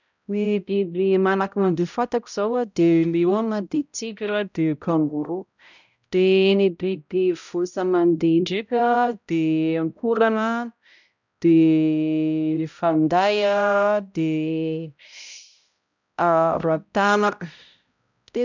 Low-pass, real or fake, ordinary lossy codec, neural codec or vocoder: 7.2 kHz; fake; none; codec, 16 kHz, 0.5 kbps, X-Codec, HuBERT features, trained on balanced general audio